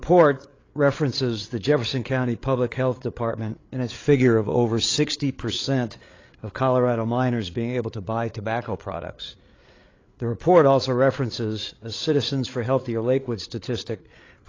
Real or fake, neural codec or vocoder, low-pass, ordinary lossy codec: fake; codec, 16 kHz, 8 kbps, FreqCodec, larger model; 7.2 kHz; AAC, 32 kbps